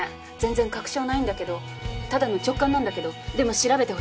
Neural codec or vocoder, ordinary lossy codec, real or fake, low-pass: none; none; real; none